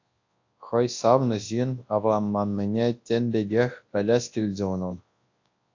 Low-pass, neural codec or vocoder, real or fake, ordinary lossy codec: 7.2 kHz; codec, 24 kHz, 0.9 kbps, WavTokenizer, large speech release; fake; AAC, 48 kbps